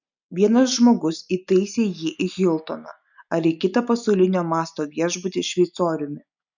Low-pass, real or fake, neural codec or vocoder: 7.2 kHz; real; none